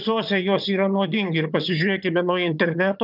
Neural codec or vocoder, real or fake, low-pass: vocoder, 22.05 kHz, 80 mel bands, HiFi-GAN; fake; 5.4 kHz